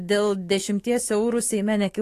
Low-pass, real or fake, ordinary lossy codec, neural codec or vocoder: 14.4 kHz; fake; AAC, 48 kbps; autoencoder, 48 kHz, 128 numbers a frame, DAC-VAE, trained on Japanese speech